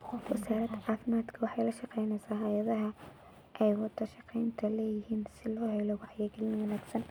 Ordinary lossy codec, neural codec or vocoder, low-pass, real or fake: none; none; none; real